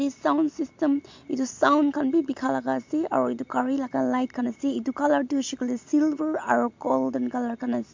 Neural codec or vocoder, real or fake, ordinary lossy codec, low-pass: none; real; MP3, 48 kbps; 7.2 kHz